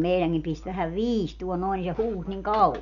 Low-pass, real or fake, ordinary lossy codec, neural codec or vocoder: 7.2 kHz; real; none; none